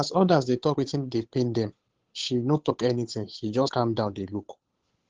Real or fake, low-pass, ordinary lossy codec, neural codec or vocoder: fake; 7.2 kHz; Opus, 16 kbps; codec, 16 kHz, 8 kbps, FunCodec, trained on Chinese and English, 25 frames a second